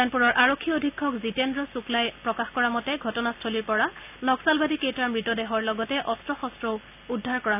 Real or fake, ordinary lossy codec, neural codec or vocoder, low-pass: real; none; none; 3.6 kHz